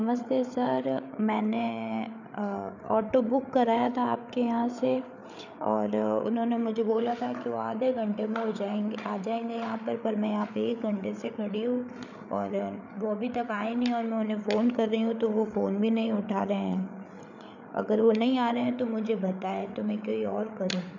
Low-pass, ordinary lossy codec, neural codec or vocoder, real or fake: 7.2 kHz; none; codec, 16 kHz, 8 kbps, FreqCodec, larger model; fake